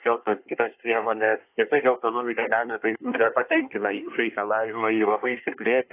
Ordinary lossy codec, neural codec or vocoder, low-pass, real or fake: AAC, 24 kbps; codec, 24 kHz, 1 kbps, SNAC; 3.6 kHz; fake